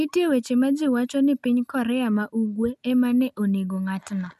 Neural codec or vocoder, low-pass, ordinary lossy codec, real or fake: none; 14.4 kHz; none; real